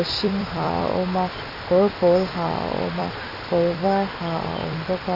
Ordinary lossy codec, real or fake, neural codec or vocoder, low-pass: none; fake; vocoder, 44.1 kHz, 128 mel bands every 256 samples, BigVGAN v2; 5.4 kHz